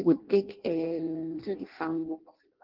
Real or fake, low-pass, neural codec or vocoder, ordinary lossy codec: fake; 5.4 kHz; codec, 16 kHz in and 24 kHz out, 0.6 kbps, FireRedTTS-2 codec; Opus, 16 kbps